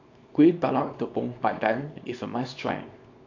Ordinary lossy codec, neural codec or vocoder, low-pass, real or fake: AAC, 48 kbps; codec, 24 kHz, 0.9 kbps, WavTokenizer, small release; 7.2 kHz; fake